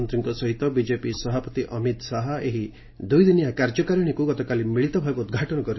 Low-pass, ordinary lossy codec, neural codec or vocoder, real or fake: 7.2 kHz; MP3, 24 kbps; none; real